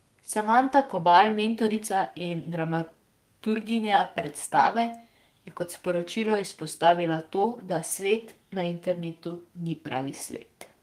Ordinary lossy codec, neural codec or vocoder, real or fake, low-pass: Opus, 32 kbps; codec, 32 kHz, 1.9 kbps, SNAC; fake; 14.4 kHz